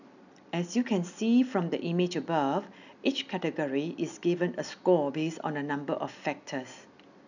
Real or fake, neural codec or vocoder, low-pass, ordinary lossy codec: real; none; 7.2 kHz; none